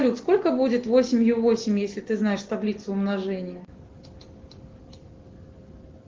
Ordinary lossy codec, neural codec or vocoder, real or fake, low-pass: Opus, 16 kbps; none; real; 7.2 kHz